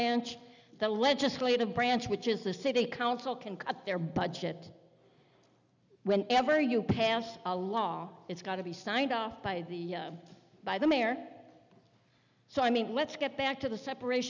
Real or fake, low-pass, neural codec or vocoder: real; 7.2 kHz; none